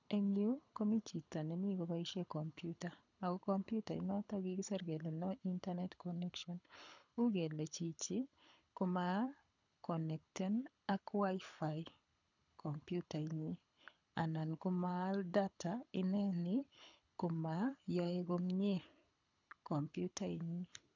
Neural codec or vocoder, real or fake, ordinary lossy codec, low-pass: codec, 24 kHz, 6 kbps, HILCodec; fake; none; 7.2 kHz